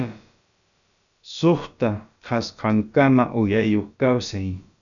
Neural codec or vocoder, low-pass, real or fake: codec, 16 kHz, about 1 kbps, DyCAST, with the encoder's durations; 7.2 kHz; fake